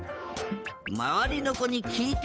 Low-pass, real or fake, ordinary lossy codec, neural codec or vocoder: none; fake; none; codec, 16 kHz, 8 kbps, FunCodec, trained on Chinese and English, 25 frames a second